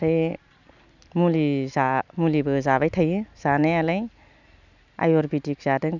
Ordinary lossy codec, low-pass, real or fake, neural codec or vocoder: none; 7.2 kHz; real; none